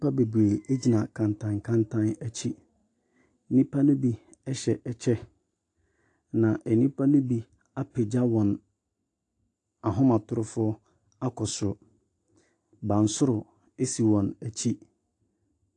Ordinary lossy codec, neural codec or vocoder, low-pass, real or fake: AAC, 48 kbps; none; 10.8 kHz; real